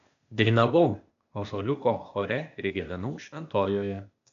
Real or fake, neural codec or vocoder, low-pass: fake; codec, 16 kHz, 0.8 kbps, ZipCodec; 7.2 kHz